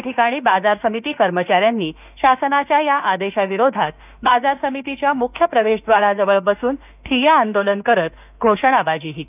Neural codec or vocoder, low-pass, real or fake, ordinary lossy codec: autoencoder, 48 kHz, 32 numbers a frame, DAC-VAE, trained on Japanese speech; 3.6 kHz; fake; none